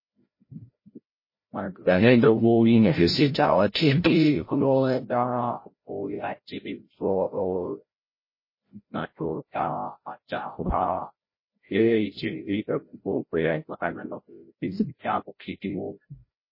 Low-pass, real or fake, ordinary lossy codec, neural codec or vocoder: 5.4 kHz; fake; MP3, 24 kbps; codec, 16 kHz, 0.5 kbps, FreqCodec, larger model